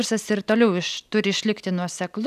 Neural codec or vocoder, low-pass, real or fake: none; 14.4 kHz; real